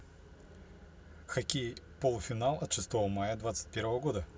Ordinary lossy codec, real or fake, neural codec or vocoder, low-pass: none; real; none; none